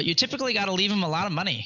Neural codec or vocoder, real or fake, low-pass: none; real; 7.2 kHz